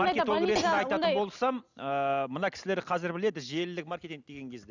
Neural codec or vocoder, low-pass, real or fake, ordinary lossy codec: none; 7.2 kHz; real; none